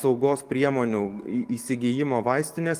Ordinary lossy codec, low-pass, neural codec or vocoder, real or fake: Opus, 24 kbps; 14.4 kHz; codec, 44.1 kHz, 7.8 kbps, DAC; fake